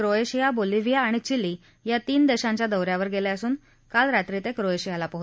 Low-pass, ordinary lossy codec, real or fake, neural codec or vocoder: none; none; real; none